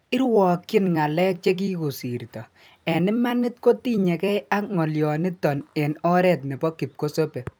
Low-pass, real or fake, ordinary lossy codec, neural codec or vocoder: none; fake; none; vocoder, 44.1 kHz, 128 mel bands every 256 samples, BigVGAN v2